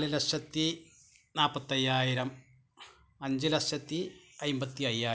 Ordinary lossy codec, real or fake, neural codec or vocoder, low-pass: none; real; none; none